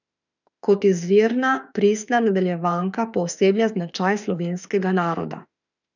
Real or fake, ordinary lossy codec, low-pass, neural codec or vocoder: fake; none; 7.2 kHz; autoencoder, 48 kHz, 32 numbers a frame, DAC-VAE, trained on Japanese speech